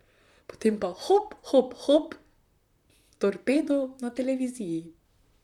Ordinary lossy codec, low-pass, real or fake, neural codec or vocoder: none; 19.8 kHz; fake; vocoder, 44.1 kHz, 128 mel bands, Pupu-Vocoder